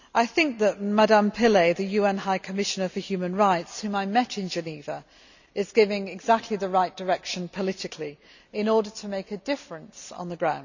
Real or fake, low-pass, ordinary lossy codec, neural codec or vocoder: real; 7.2 kHz; none; none